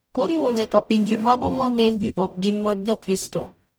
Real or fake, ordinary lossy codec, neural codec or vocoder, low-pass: fake; none; codec, 44.1 kHz, 0.9 kbps, DAC; none